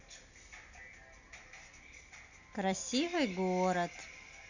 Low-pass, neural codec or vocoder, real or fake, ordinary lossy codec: 7.2 kHz; none; real; none